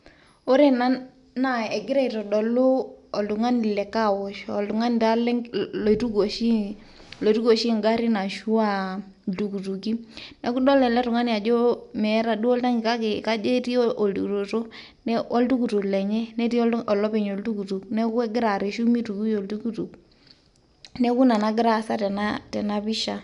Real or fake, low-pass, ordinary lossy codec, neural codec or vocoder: real; 10.8 kHz; none; none